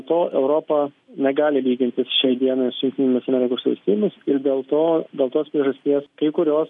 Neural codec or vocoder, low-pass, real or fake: none; 10.8 kHz; real